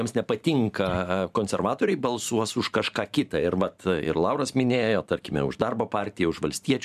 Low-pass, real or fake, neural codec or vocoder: 14.4 kHz; fake; vocoder, 44.1 kHz, 128 mel bands every 512 samples, BigVGAN v2